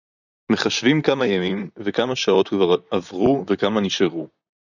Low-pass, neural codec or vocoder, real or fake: 7.2 kHz; vocoder, 44.1 kHz, 128 mel bands, Pupu-Vocoder; fake